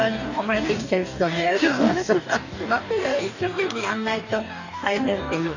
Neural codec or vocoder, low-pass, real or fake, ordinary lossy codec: codec, 44.1 kHz, 2.6 kbps, DAC; 7.2 kHz; fake; none